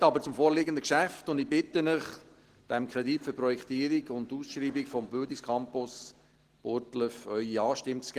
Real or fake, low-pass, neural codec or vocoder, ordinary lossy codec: real; 14.4 kHz; none; Opus, 16 kbps